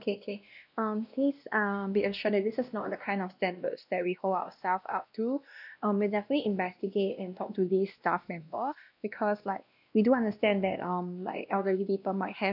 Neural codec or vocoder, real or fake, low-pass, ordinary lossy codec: codec, 16 kHz, 1 kbps, X-Codec, WavLM features, trained on Multilingual LibriSpeech; fake; 5.4 kHz; none